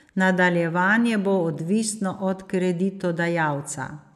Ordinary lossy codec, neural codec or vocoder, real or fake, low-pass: none; none; real; 14.4 kHz